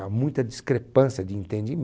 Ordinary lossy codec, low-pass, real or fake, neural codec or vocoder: none; none; real; none